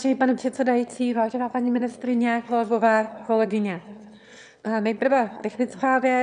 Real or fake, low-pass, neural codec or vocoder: fake; 9.9 kHz; autoencoder, 22.05 kHz, a latent of 192 numbers a frame, VITS, trained on one speaker